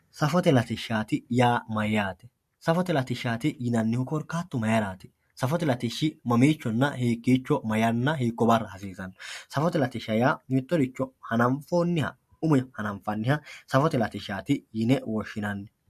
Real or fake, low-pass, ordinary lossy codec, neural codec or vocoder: real; 14.4 kHz; MP3, 64 kbps; none